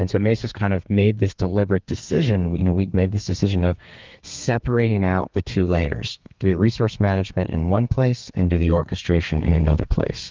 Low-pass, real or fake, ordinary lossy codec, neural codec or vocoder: 7.2 kHz; fake; Opus, 32 kbps; codec, 32 kHz, 1.9 kbps, SNAC